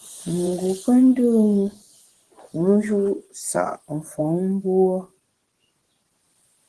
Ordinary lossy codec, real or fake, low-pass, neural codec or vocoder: Opus, 16 kbps; fake; 10.8 kHz; vocoder, 24 kHz, 100 mel bands, Vocos